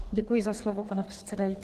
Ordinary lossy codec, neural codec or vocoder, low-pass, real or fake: Opus, 16 kbps; codec, 32 kHz, 1.9 kbps, SNAC; 14.4 kHz; fake